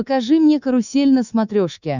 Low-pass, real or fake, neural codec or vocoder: 7.2 kHz; fake; codec, 24 kHz, 3.1 kbps, DualCodec